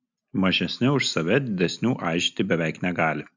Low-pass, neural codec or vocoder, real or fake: 7.2 kHz; none; real